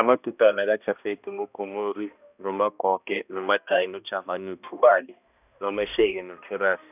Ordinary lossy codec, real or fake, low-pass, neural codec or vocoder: none; fake; 3.6 kHz; codec, 16 kHz, 1 kbps, X-Codec, HuBERT features, trained on balanced general audio